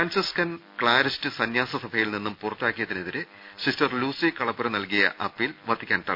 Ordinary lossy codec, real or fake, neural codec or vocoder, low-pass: none; real; none; 5.4 kHz